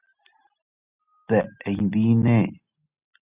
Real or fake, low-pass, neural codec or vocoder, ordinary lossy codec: real; 3.6 kHz; none; Opus, 64 kbps